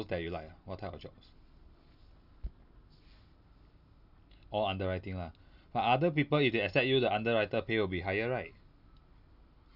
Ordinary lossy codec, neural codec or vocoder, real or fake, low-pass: none; none; real; 5.4 kHz